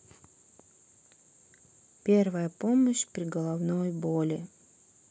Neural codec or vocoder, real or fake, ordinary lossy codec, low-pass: none; real; none; none